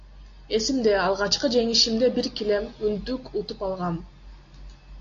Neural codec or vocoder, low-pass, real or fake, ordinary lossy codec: none; 7.2 kHz; real; AAC, 48 kbps